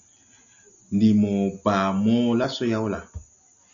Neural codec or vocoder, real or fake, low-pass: none; real; 7.2 kHz